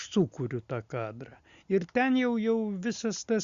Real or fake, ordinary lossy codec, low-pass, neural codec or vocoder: real; Opus, 64 kbps; 7.2 kHz; none